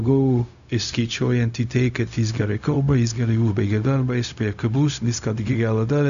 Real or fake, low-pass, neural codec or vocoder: fake; 7.2 kHz; codec, 16 kHz, 0.4 kbps, LongCat-Audio-Codec